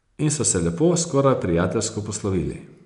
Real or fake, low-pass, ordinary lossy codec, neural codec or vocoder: real; 10.8 kHz; none; none